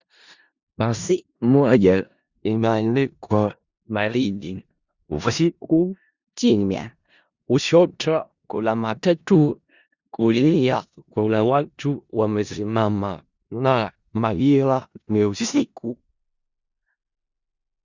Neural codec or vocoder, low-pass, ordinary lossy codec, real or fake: codec, 16 kHz in and 24 kHz out, 0.4 kbps, LongCat-Audio-Codec, four codebook decoder; 7.2 kHz; Opus, 64 kbps; fake